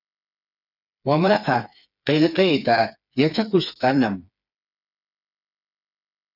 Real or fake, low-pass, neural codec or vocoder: fake; 5.4 kHz; codec, 16 kHz, 4 kbps, FreqCodec, smaller model